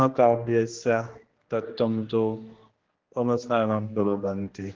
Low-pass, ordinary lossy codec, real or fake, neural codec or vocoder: 7.2 kHz; Opus, 16 kbps; fake; codec, 16 kHz, 1 kbps, X-Codec, HuBERT features, trained on general audio